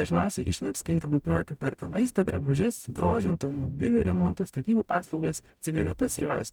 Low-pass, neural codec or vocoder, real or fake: 19.8 kHz; codec, 44.1 kHz, 0.9 kbps, DAC; fake